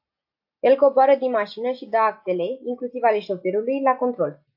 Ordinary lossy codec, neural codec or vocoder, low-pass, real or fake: AAC, 48 kbps; none; 5.4 kHz; real